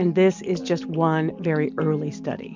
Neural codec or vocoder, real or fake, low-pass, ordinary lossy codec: none; real; 7.2 kHz; MP3, 64 kbps